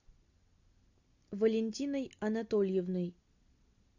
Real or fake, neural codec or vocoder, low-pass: real; none; 7.2 kHz